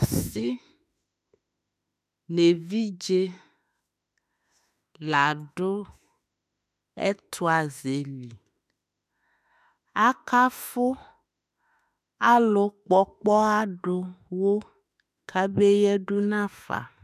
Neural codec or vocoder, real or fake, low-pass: autoencoder, 48 kHz, 32 numbers a frame, DAC-VAE, trained on Japanese speech; fake; 14.4 kHz